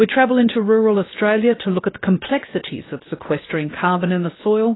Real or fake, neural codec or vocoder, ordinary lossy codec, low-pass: fake; codec, 16 kHz, 0.7 kbps, FocalCodec; AAC, 16 kbps; 7.2 kHz